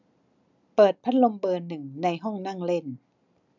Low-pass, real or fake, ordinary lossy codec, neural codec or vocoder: 7.2 kHz; real; none; none